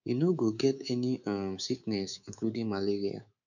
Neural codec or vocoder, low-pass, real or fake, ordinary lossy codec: codec, 24 kHz, 3.1 kbps, DualCodec; 7.2 kHz; fake; none